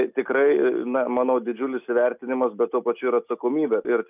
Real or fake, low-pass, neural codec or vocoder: real; 3.6 kHz; none